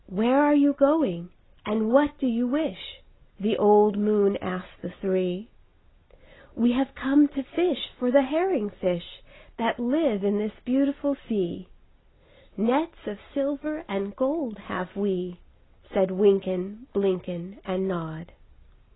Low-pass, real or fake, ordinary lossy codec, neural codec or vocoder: 7.2 kHz; real; AAC, 16 kbps; none